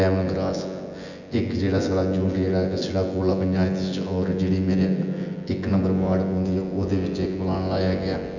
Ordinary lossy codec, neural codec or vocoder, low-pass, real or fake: none; vocoder, 24 kHz, 100 mel bands, Vocos; 7.2 kHz; fake